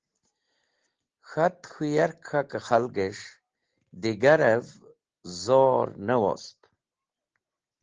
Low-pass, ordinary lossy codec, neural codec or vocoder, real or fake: 7.2 kHz; Opus, 16 kbps; none; real